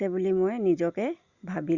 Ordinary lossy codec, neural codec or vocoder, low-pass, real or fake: Opus, 64 kbps; none; 7.2 kHz; real